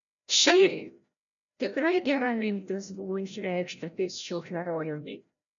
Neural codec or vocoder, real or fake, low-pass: codec, 16 kHz, 0.5 kbps, FreqCodec, larger model; fake; 7.2 kHz